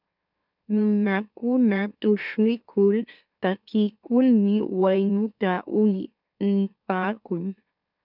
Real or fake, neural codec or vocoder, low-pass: fake; autoencoder, 44.1 kHz, a latent of 192 numbers a frame, MeloTTS; 5.4 kHz